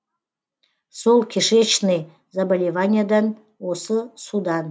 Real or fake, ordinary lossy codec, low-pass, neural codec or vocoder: real; none; none; none